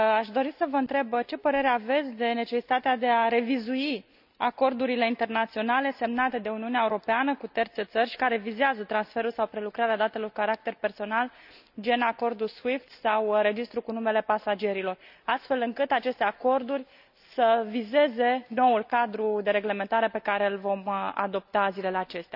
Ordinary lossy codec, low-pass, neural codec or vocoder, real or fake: none; 5.4 kHz; none; real